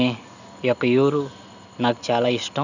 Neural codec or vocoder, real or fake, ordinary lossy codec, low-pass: none; real; none; 7.2 kHz